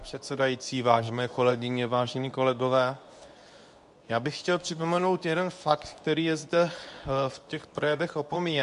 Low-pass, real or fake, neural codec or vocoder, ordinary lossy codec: 10.8 kHz; fake; codec, 24 kHz, 0.9 kbps, WavTokenizer, medium speech release version 2; MP3, 96 kbps